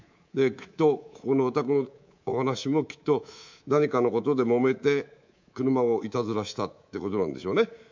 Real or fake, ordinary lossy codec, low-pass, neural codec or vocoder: fake; MP3, 64 kbps; 7.2 kHz; codec, 24 kHz, 3.1 kbps, DualCodec